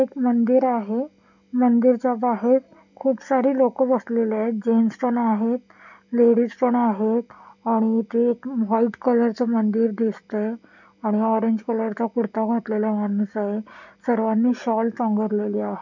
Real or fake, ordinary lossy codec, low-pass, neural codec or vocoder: real; none; 7.2 kHz; none